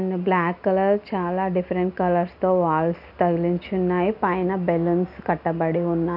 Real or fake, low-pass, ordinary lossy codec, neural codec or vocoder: real; 5.4 kHz; none; none